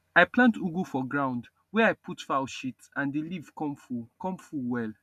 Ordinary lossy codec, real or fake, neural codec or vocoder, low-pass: none; real; none; 14.4 kHz